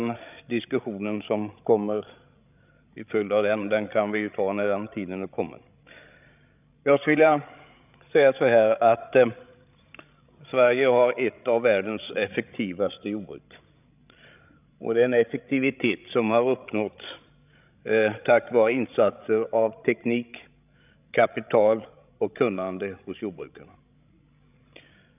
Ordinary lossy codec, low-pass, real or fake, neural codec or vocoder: none; 3.6 kHz; fake; codec, 16 kHz, 16 kbps, FreqCodec, larger model